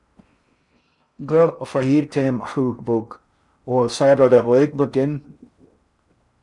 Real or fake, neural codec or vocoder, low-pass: fake; codec, 16 kHz in and 24 kHz out, 0.6 kbps, FocalCodec, streaming, 4096 codes; 10.8 kHz